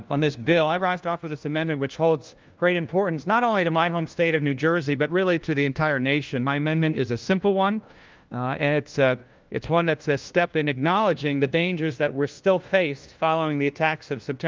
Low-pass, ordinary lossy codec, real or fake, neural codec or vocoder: 7.2 kHz; Opus, 32 kbps; fake; codec, 16 kHz, 1 kbps, FunCodec, trained on LibriTTS, 50 frames a second